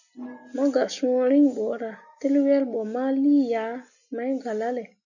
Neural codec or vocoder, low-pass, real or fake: none; 7.2 kHz; real